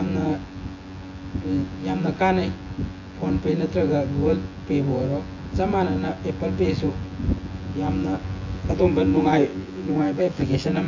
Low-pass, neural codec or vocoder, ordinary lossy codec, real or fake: 7.2 kHz; vocoder, 24 kHz, 100 mel bands, Vocos; AAC, 48 kbps; fake